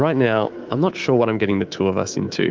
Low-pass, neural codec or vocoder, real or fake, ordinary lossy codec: 7.2 kHz; codec, 24 kHz, 3.1 kbps, DualCodec; fake; Opus, 24 kbps